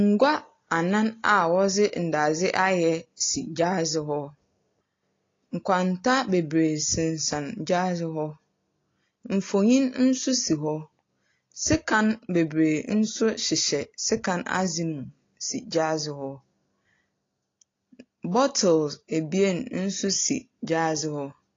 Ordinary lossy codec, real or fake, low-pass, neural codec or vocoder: AAC, 32 kbps; real; 7.2 kHz; none